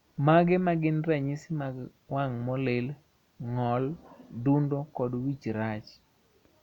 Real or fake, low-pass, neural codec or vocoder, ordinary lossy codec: real; 19.8 kHz; none; none